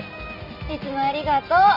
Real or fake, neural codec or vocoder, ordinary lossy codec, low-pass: real; none; none; 5.4 kHz